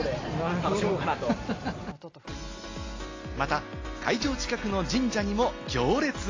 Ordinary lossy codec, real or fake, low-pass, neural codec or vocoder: AAC, 32 kbps; real; 7.2 kHz; none